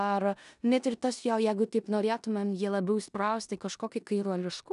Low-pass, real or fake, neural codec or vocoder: 10.8 kHz; fake; codec, 16 kHz in and 24 kHz out, 0.9 kbps, LongCat-Audio-Codec, four codebook decoder